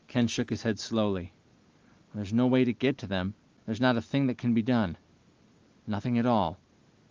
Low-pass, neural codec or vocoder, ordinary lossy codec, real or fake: 7.2 kHz; none; Opus, 24 kbps; real